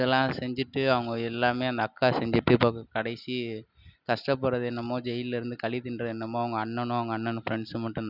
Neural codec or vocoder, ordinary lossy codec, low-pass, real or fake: none; none; 5.4 kHz; real